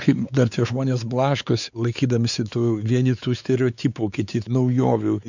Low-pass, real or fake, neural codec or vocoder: 7.2 kHz; fake; codec, 16 kHz, 4 kbps, X-Codec, WavLM features, trained on Multilingual LibriSpeech